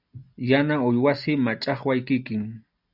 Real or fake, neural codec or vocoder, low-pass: real; none; 5.4 kHz